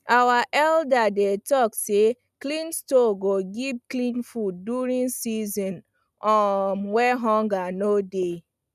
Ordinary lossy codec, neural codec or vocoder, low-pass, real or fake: none; none; 14.4 kHz; real